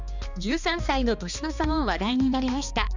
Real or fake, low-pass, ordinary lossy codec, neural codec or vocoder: fake; 7.2 kHz; none; codec, 16 kHz, 2 kbps, X-Codec, HuBERT features, trained on balanced general audio